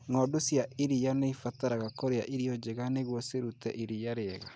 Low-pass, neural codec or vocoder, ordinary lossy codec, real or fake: none; none; none; real